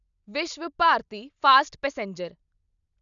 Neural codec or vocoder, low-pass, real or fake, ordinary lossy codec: none; 7.2 kHz; real; none